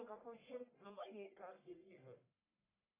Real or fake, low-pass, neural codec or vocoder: fake; 3.6 kHz; codec, 44.1 kHz, 1.7 kbps, Pupu-Codec